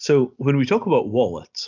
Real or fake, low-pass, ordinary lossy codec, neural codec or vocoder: real; 7.2 kHz; MP3, 64 kbps; none